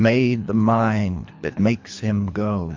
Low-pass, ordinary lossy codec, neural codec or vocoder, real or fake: 7.2 kHz; MP3, 64 kbps; codec, 24 kHz, 3 kbps, HILCodec; fake